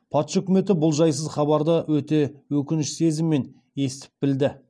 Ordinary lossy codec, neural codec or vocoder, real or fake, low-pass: none; none; real; none